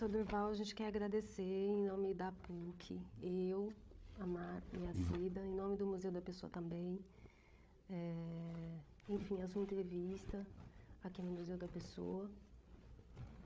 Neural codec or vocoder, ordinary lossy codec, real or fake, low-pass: codec, 16 kHz, 8 kbps, FreqCodec, larger model; none; fake; none